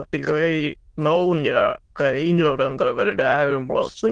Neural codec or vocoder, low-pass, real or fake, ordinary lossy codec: autoencoder, 22.05 kHz, a latent of 192 numbers a frame, VITS, trained on many speakers; 9.9 kHz; fake; Opus, 16 kbps